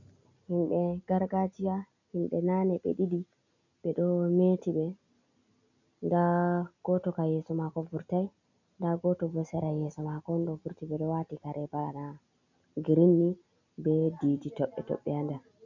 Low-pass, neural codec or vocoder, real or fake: 7.2 kHz; none; real